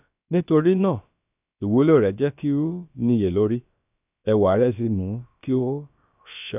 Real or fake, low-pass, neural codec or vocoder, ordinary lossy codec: fake; 3.6 kHz; codec, 16 kHz, about 1 kbps, DyCAST, with the encoder's durations; none